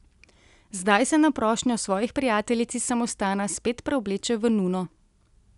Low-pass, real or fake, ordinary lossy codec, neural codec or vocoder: 10.8 kHz; real; none; none